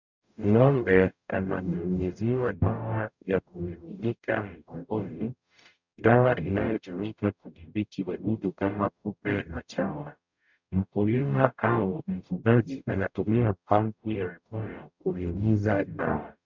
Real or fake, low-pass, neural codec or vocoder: fake; 7.2 kHz; codec, 44.1 kHz, 0.9 kbps, DAC